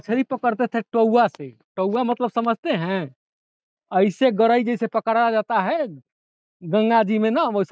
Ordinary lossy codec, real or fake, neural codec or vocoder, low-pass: none; real; none; none